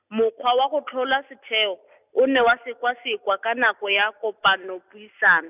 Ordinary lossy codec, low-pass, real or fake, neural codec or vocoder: none; 3.6 kHz; real; none